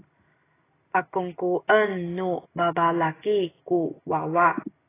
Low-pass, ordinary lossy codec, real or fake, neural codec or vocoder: 3.6 kHz; AAC, 16 kbps; real; none